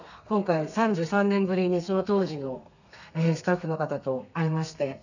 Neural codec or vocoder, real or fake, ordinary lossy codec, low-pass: codec, 32 kHz, 1.9 kbps, SNAC; fake; none; 7.2 kHz